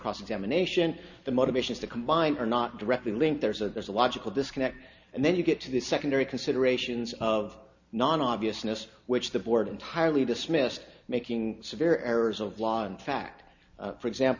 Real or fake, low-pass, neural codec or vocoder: real; 7.2 kHz; none